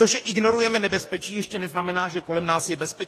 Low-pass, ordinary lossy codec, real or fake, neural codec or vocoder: 14.4 kHz; AAC, 48 kbps; fake; codec, 44.1 kHz, 2.6 kbps, DAC